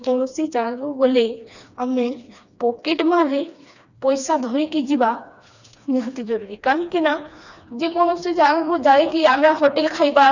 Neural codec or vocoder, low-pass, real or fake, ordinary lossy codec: codec, 16 kHz, 2 kbps, FreqCodec, smaller model; 7.2 kHz; fake; none